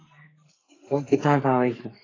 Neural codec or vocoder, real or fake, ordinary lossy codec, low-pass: codec, 44.1 kHz, 2.6 kbps, SNAC; fake; AAC, 32 kbps; 7.2 kHz